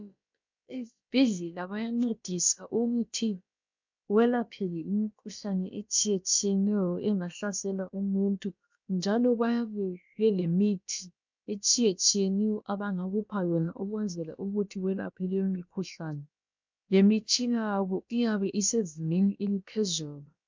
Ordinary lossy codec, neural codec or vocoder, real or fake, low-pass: MP3, 64 kbps; codec, 16 kHz, about 1 kbps, DyCAST, with the encoder's durations; fake; 7.2 kHz